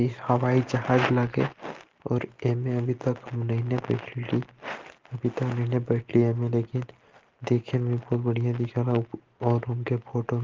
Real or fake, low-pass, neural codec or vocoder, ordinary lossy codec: real; 7.2 kHz; none; Opus, 16 kbps